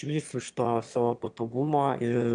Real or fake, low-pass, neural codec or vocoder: fake; 9.9 kHz; autoencoder, 22.05 kHz, a latent of 192 numbers a frame, VITS, trained on one speaker